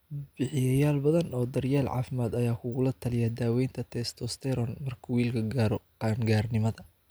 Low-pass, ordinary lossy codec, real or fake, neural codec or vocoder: none; none; real; none